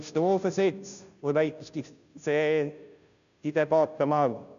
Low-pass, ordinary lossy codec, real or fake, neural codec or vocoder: 7.2 kHz; none; fake; codec, 16 kHz, 0.5 kbps, FunCodec, trained on Chinese and English, 25 frames a second